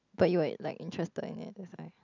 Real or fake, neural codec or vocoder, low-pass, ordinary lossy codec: real; none; 7.2 kHz; none